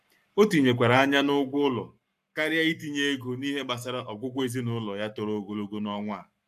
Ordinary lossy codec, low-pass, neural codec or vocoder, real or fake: AAC, 96 kbps; 14.4 kHz; codec, 44.1 kHz, 7.8 kbps, Pupu-Codec; fake